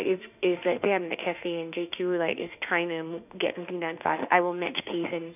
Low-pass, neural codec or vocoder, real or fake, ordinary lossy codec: 3.6 kHz; autoencoder, 48 kHz, 32 numbers a frame, DAC-VAE, trained on Japanese speech; fake; none